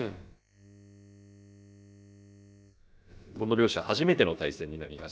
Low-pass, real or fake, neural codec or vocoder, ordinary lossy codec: none; fake; codec, 16 kHz, about 1 kbps, DyCAST, with the encoder's durations; none